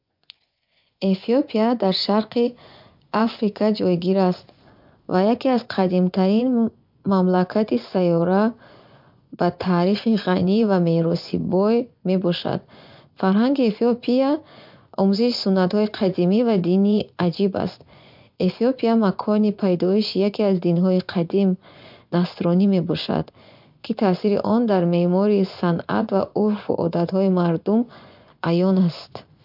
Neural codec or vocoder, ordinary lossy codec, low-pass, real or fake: vocoder, 24 kHz, 100 mel bands, Vocos; MP3, 48 kbps; 5.4 kHz; fake